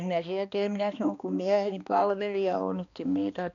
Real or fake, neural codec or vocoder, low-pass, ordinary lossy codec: fake; codec, 16 kHz, 2 kbps, X-Codec, HuBERT features, trained on balanced general audio; 7.2 kHz; MP3, 96 kbps